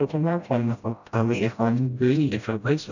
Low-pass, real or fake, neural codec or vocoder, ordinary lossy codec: 7.2 kHz; fake; codec, 16 kHz, 0.5 kbps, FreqCodec, smaller model; none